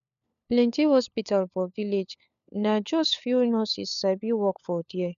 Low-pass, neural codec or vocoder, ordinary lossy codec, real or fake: 7.2 kHz; codec, 16 kHz, 4 kbps, FunCodec, trained on LibriTTS, 50 frames a second; none; fake